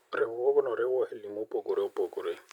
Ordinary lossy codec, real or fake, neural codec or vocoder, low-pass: none; real; none; 19.8 kHz